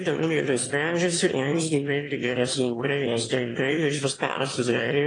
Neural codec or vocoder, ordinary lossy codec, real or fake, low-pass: autoencoder, 22.05 kHz, a latent of 192 numbers a frame, VITS, trained on one speaker; AAC, 32 kbps; fake; 9.9 kHz